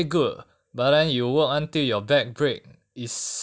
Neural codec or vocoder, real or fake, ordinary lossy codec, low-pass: none; real; none; none